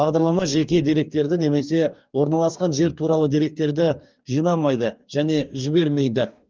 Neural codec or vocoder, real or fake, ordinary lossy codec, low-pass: codec, 44.1 kHz, 2.6 kbps, DAC; fake; Opus, 24 kbps; 7.2 kHz